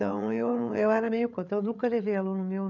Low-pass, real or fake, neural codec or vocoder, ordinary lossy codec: 7.2 kHz; fake; codec, 16 kHz, 8 kbps, FreqCodec, larger model; none